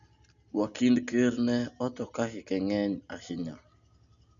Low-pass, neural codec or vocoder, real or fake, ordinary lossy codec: 7.2 kHz; none; real; none